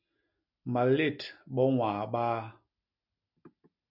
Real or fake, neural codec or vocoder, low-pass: real; none; 5.4 kHz